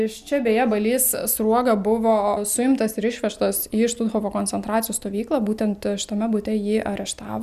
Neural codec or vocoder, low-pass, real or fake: none; 14.4 kHz; real